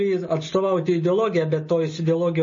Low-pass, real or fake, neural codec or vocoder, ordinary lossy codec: 7.2 kHz; real; none; MP3, 32 kbps